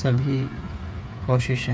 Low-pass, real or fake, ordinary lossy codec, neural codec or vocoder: none; fake; none; codec, 16 kHz, 8 kbps, FreqCodec, smaller model